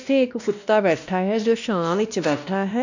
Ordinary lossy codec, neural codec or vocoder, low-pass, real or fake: none; codec, 16 kHz, 1 kbps, X-Codec, WavLM features, trained on Multilingual LibriSpeech; 7.2 kHz; fake